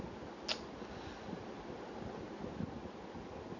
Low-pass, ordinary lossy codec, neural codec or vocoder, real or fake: 7.2 kHz; none; none; real